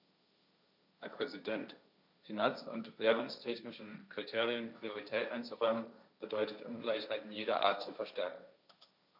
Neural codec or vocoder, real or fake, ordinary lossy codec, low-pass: codec, 16 kHz, 1.1 kbps, Voila-Tokenizer; fake; none; 5.4 kHz